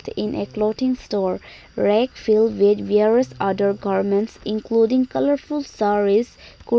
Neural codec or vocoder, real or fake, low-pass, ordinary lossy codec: none; real; none; none